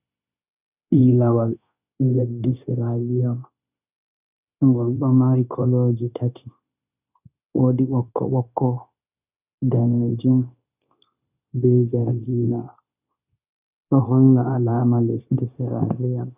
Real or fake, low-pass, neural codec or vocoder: fake; 3.6 kHz; codec, 16 kHz, 0.9 kbps, LongCat-Audio-Codec